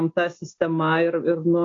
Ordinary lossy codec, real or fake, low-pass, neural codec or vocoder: AAC, 32 kbps; real; 7.2 kHz; none